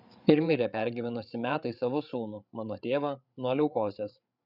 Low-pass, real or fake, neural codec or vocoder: 5.4 kHz; fake; codec, 16 kHz, 8 kbps, FreqCodec, larger model